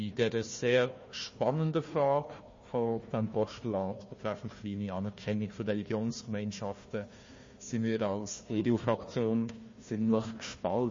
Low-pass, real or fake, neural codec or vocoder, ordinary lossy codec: 7.2 kHz; fake; codec, 16 kHz, 1 kbps, FunCodec, trained on Chinese and English, 50 frames a second; MP3, 32 kbps